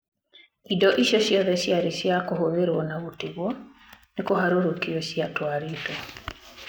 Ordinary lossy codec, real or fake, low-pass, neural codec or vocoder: none; real; none; none